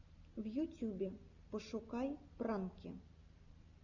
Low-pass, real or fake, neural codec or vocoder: 7.2 kHz; real; none